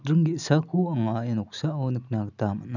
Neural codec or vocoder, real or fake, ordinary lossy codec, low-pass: none; real; none; 7.2 kHz